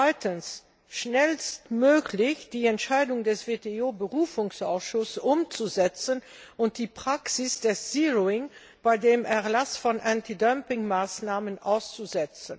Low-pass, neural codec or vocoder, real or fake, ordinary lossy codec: none; none; real; none